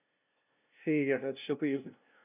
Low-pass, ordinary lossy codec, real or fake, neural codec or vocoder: 3.6 kHz; none; fake; codec, 16 kHz, 0.5 kbps, FunCodec, trained on LibriTTS, 25 frames a second